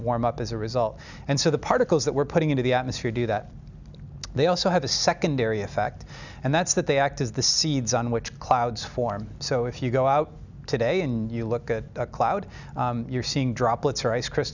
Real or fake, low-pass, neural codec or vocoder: real; 7.2 kHz; none